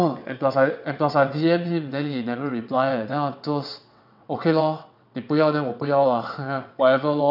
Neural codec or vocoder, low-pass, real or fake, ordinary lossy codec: vocoder, 22.05 kHz, 80 mel bands, WaveNeXt; 5.4 kHz; fake; none